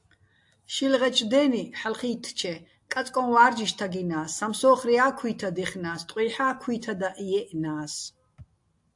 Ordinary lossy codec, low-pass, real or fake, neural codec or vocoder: AAC, 64 kbps; 10.8 kHz; real; none